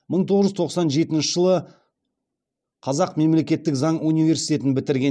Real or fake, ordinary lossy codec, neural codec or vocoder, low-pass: real; none; none; none